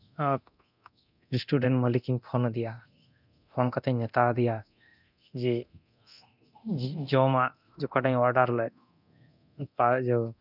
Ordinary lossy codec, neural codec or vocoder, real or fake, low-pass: none; codec, 24 kHz, 0.9 kbps, DualCodec; fake; 5.4 kHz